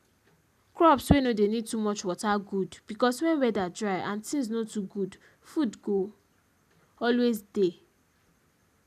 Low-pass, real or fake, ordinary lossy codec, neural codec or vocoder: 14.4 kHz; real; none; none